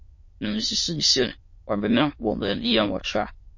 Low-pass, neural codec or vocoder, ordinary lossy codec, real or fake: 7.2 kHz; autoencoder, 22.05 kHz, a latent of 192 numbers a frame, VITS, trained on many speakers; MP3, 32 kbps; fake